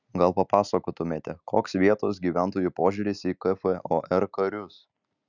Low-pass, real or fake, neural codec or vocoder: 7.2 kHz; real; none